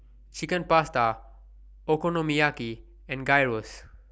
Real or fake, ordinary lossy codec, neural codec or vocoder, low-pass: real; none; none; none